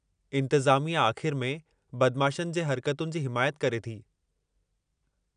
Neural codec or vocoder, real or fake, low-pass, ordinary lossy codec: none; real; 9.9 kHz; none